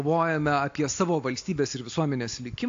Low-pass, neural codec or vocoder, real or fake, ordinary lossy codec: 7.2 kHz; none; real; AAC, 48 kbps